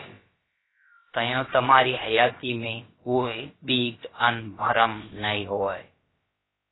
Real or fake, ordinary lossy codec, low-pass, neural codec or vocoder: fake; AAC, 16 kbps; 7.2 kHz; codec, 16 kHz, about 1 kbps, DyCAST, with the encoder's durations